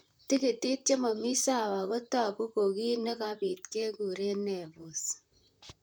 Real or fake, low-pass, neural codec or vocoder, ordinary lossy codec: fake; none; vocoder, 44.1 kHz, 128 mel bands, Pupu-Vocoder; none